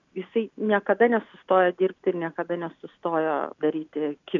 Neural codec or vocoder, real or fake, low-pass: none; real; 7.2 kHz